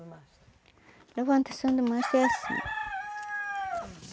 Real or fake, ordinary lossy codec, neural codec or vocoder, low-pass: real; none; none; none